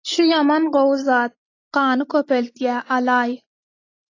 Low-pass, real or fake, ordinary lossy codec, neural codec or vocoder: 7.2 kHz; real; AAC, 32 kbps; none